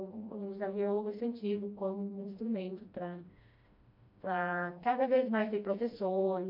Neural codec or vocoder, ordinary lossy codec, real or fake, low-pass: codec, 16 kHz, 1 kbps, FreqCodec, smaller model; MP3, 48 kbps; fake; 5.4 kHz